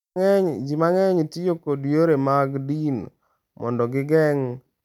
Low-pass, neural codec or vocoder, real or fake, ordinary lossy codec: 19.8 kHz; vocoder, 44.1 kHz, 128 mel bands every 256 samples, BigVGAN v2; fake; none